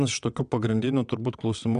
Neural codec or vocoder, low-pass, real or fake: vocoder, 22.05 kHz, 80 mel bands, WaveNeXt; 9.9 kHz; fake